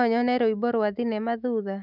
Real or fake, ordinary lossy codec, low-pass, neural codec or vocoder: fake; none; 5.4 kHz; autoencoder, 48 kHz, 128 numbers a frame, DAC-VAE, trained on Japanese speech